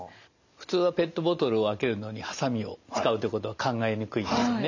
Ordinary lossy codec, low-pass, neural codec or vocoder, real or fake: none; 7.2 kHz; none; real